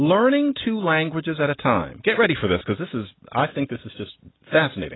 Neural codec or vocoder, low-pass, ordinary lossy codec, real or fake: none; 7.2 kHz; AAC, 16 kbps; real